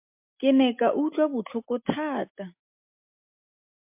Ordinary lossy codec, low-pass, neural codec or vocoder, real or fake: MP3, 32 kbps; 3.6 kHz; none; real